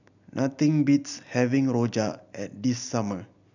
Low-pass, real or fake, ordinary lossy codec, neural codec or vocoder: 7.2 kHz; real; none; none